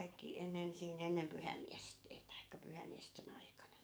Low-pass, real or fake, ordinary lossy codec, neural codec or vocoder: none; fake; none; codec, 44.1 kHz, 7.8 kbps, DAC